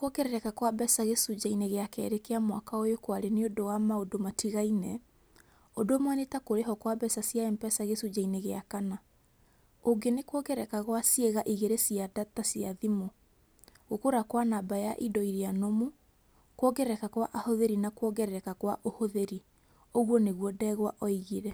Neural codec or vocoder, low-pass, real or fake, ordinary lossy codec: none; none; real; none